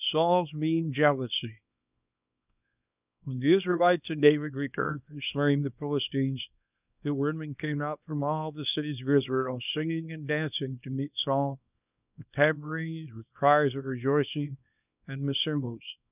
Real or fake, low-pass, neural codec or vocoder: fake; 3.6 kHz; codec, 24 kHz, 0.9 kbps, WavTokenizer, small release